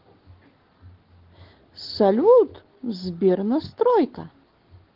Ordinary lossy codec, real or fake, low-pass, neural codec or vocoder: Opus, 16 kbps; real; 5.4 kHz; none